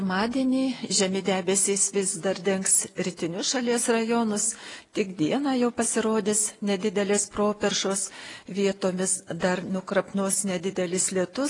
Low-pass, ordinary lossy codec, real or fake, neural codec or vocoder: 10.8 kHz; AAC, 32 kbps; real; none